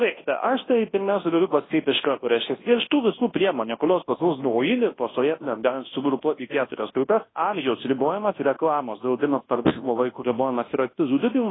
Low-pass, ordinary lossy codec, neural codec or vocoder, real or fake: 7.2 kHz; AAC, 16 kbps; codec, 24 kHz, 0.9 kbps, WavTokenizer, large speech release; fake